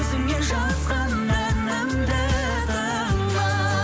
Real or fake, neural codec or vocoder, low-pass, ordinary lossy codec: real; none; none; none